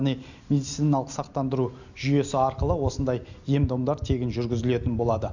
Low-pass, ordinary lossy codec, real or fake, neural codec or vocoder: 7.2 kHz; none; real; none